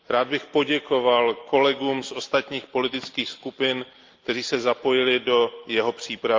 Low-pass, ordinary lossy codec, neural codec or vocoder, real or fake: 7.2 kHz; Opus, 32 kbps; none; real